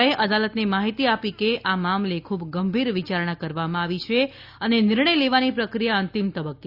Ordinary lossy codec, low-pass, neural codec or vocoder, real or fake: Opus, 64 kbps; 5.4 kHz; none; real